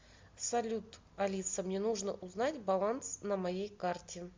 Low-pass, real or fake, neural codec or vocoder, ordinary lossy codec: 7.2 kHz; real; none; MP3, 64 kbps